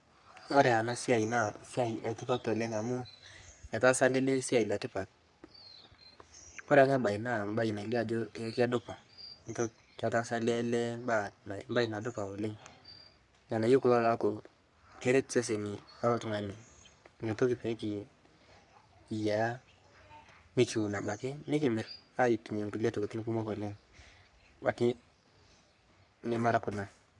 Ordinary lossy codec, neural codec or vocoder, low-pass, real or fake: none; codec, 44.1 kHz, 3.4 kbps, Pupu-Codec; 10.8 kHz; fake